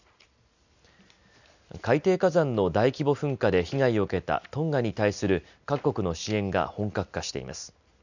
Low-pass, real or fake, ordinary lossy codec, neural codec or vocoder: 7.2 kHz; real; none; none